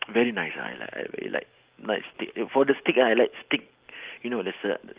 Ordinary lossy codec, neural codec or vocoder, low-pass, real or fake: Opus, 32 kbps; none; 3.6 kHz; real